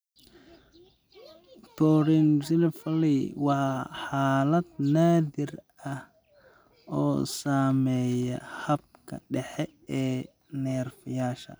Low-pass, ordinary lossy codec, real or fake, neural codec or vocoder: none; none; real; none